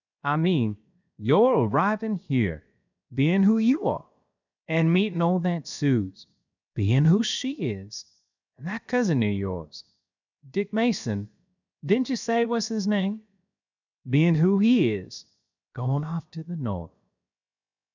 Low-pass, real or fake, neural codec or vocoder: 7.2 kHz; fake; codec, 16 kHz, 0.7 kbps, FocalCodec